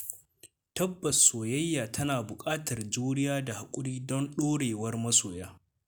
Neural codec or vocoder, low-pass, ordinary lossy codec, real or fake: none; none; none; real